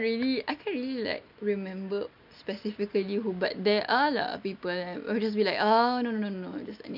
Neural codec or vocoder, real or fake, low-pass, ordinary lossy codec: none; real; 5.4 kHz; none